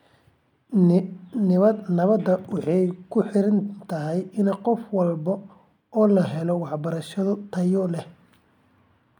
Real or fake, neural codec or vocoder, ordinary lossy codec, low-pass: real; none; MP3, 96 kbps; 19.8 kHz